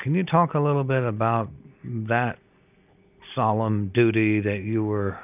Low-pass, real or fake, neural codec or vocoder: 3.6 kHz; real; none